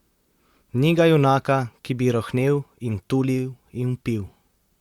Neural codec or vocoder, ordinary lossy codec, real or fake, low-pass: vocoder, 44.1 kHz, 128 mel bands, Pupu-Vocoder; Opus, 64 kbps; fake; 19.8 kHz